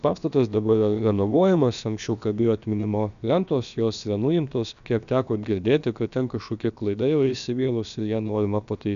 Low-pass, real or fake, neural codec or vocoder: 7.2 kHz; fake; codec, 16 kHz, about 1 kbps, DyCAST, with the encoder's durations